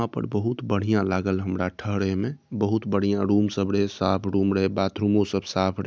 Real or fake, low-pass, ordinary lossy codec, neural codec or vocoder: real; none; none; none